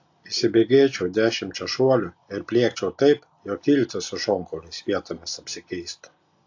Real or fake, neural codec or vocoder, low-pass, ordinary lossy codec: real; none; 7.2 kHz; AAC, 48 kbps